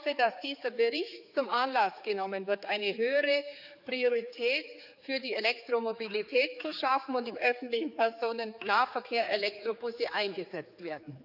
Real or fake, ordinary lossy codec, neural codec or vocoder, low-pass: fake; none; codec, 16 kHz, 4 kbps, X-Codec, HuBERT features, trained on general audio; 5.4 kHz